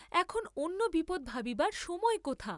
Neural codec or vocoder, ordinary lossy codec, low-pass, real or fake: none; none; 10.8 kHz; real